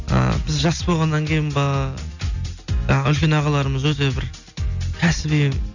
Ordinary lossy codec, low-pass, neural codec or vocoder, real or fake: none; 7.2 kHz; none; real